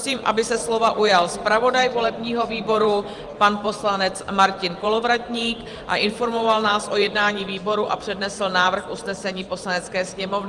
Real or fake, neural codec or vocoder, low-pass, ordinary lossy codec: fake; vocoder, 44.1 kHz, 128 mel bands every 512 samples, BigVGAN v2; 10.8 kHz; Opus, 32 kbps